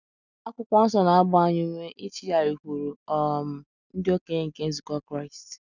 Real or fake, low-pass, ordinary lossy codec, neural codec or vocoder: real; 7.2 kHz; none; none